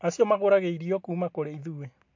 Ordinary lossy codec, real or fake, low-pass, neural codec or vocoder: MP3, 48 kbps; real; 7.2 kHz; none